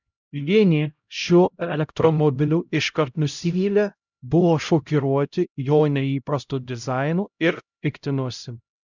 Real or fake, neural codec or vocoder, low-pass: fake; codec, 16 kHz, 0.5 kbps, X-Codec, HuBERT features, trained on LibriSpeech; 7.2 kHz